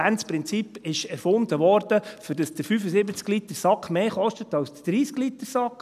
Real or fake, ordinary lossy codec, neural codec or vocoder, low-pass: real; none; none; 14.4 kHz